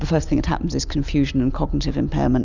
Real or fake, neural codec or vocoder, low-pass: real; none; 7.2 kHz